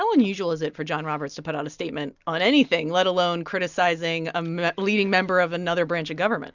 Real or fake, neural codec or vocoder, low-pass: real; none; 7.2 kHz